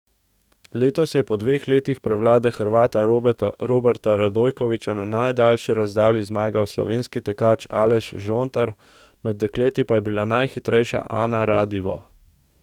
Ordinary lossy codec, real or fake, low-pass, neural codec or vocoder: none; fake; 19.8 kHz; codec, 44.1 kHz, 2.6 kbps, DAC